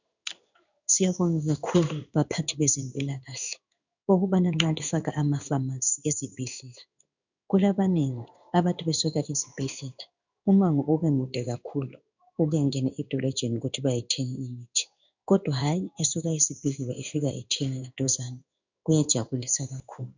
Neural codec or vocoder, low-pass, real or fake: codec, 16 kHz in and 24 kHz out, 1 kbps, XY-Tokenizer; 7.2 kHz; fake